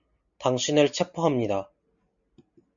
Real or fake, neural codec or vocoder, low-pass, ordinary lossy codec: real; none; 7.2 kHz; MP3, 96 kbps